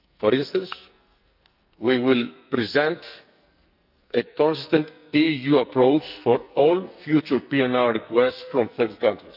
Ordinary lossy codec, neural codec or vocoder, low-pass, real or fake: none; codec, 44.1 kHz, 2.6 kbps, SNAC; 5.4 kHz; fake